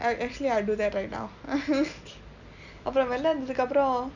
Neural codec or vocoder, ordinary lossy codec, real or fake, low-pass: none; none; real; 7.2 kHz